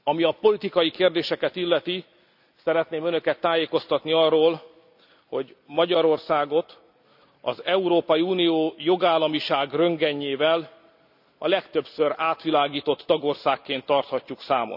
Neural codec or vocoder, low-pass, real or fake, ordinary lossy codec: none; 5.4 kHz; real; none